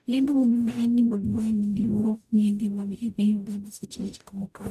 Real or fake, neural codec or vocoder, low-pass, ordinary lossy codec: fake; codec, 44.1 kHz, 0.9 kbps, DAC; 14.4 kHz; none